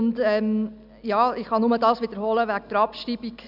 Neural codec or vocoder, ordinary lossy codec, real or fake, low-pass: none; none; real; 5.4 kHz